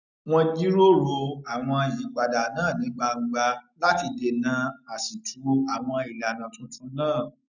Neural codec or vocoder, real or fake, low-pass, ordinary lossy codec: none; real; 7.2 kHz; none